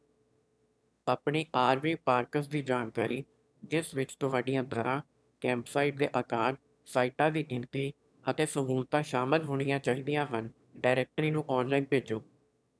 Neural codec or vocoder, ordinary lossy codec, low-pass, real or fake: autoencoder, 22.05 kHz, a latent of 192 numbers a frame, VITS, trained on one speaker; none; none; fake